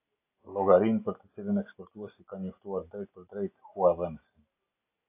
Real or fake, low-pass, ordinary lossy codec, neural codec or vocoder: real; 3.6 kHz; AAC, 24 kbps; none